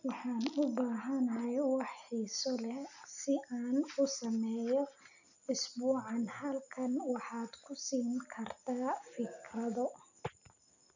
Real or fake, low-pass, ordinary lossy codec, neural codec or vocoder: real; 7.2 kHz; none; none